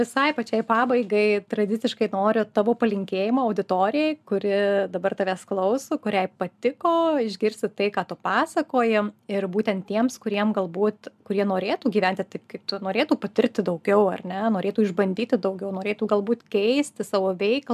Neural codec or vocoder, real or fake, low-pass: none; real; 14.4 kHz